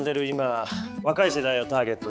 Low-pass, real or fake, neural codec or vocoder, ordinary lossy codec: none; fake; codec, 16 kHz, 4 kbps, X-Codec, HuBERT features, trained on balanced general audio; none